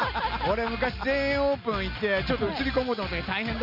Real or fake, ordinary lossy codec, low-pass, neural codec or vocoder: real; none; 5.4 kHz; none